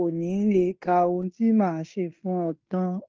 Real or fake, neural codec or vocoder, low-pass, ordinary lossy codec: fake; codec, 16 kHz in and 24 kHz out, 0.9 kbps, LongCat-Audio-Codec, fine tuned four codebook decoder; 7.2 kHz; Opus, 24 kbps